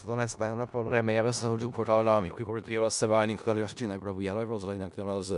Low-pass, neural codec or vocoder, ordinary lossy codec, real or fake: 10.8 kHz; codec, 16 kHz in and 24 kHz out, 0.4 kbps, LongCat-Audio-Codec, four codebook decoder; MP3, 96 kbps; fake